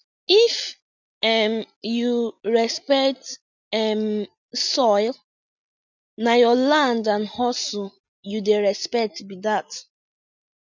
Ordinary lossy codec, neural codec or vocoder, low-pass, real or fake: none; none; 7.2 kHz; real